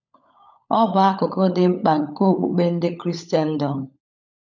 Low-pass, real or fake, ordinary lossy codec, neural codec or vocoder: 7.2 kHz; fake; none; codec, 16 kHz, 16 kbps, FunCodec, trained on LibriTTS, 50 frames a second